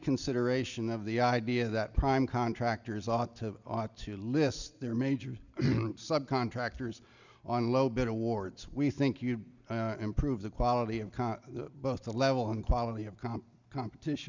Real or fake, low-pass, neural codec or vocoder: real; 7.2 kHz; none